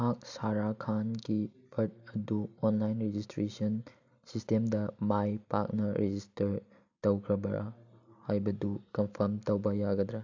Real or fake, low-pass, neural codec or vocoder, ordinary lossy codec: real; 7.2 kHz; none; AAC, 48 kbps